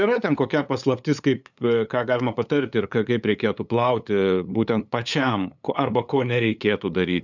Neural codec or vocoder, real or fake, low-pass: codec, 16 kHz, 8 kbps, FunCodec, trained on LibriTTS, 25 frames a second; fake; 7.2 kHz